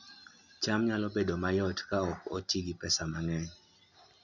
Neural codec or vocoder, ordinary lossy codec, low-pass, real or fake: none; none; 7.2 kHz; real